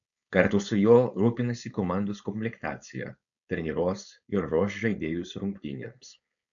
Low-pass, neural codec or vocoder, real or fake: 7.2 kHz; codec, 16 kHz, 4.8 kbps, FACodec; fake